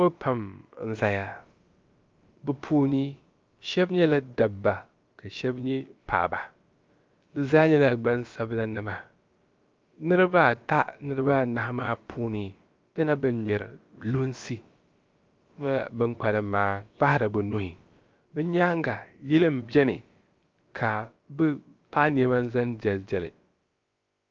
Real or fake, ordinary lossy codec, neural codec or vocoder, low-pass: fake; Opus, 24 kbps; codec, 16 kHz, about 1 kbps, DyCAST, with the encoder's durations; 7.2 kHz